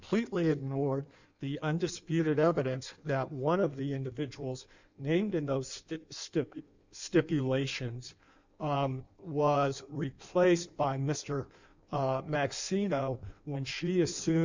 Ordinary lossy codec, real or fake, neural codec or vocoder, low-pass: Opus, 64 kbps; fake; codec, 16 kHz in and 24 kHz out, 1.1 kbps, FireRedTTS-2 codec; 7.2 kHz